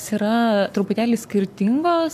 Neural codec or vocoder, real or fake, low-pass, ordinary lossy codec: codec, 44.1 kHz, 7.8 kbps, Pupu-Codec; fake; 14.4 kHz; AAC, 96 kbps